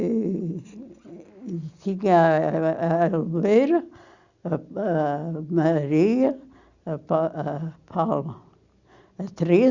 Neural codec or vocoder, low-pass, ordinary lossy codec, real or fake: none; 7.2 kHz; Opus, 64 kbps; real